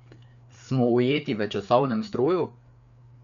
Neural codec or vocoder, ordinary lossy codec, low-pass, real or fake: codec, 16 kHz, 4 kbps, FreqCodec, larger model; none; 7.2 kHz; fake